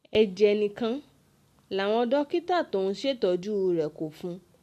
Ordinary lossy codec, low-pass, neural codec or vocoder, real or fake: MP3, 64 kbps; 14.4 kHz; none; real